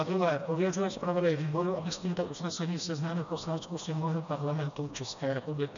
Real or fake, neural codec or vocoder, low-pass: fake; codec, 16 kHz, 1 kbps, FreqCodec, smaller model; 7.2 kHz